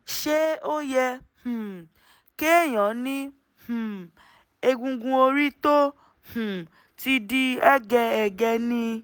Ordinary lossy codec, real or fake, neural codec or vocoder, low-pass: none; real; none; none